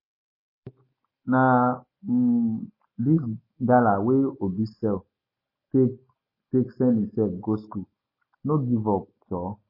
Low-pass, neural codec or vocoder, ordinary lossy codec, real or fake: 5.4 kHz; none; MP3, 24 kbps; real